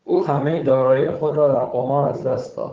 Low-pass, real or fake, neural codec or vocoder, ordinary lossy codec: 7.2 kHz; fake; codec, 16 kHz, 4 kbps, FunCodec, trained on Chinese and English, 50 frames a second; Opus, 16 kbps